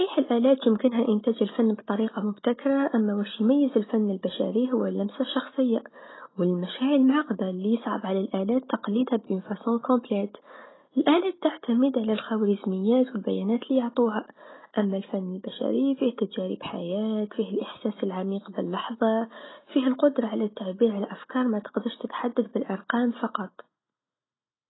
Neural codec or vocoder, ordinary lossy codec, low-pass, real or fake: none; AAC, 16 kbps; 7.2 kHz; real